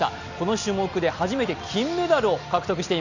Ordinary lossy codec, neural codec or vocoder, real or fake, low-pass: none; none; real; 7.2 kHz